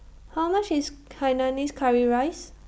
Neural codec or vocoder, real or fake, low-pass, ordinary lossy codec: none; real; none; none